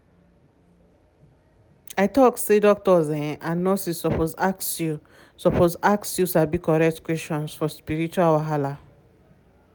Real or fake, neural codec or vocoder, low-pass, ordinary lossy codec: real; none; none; none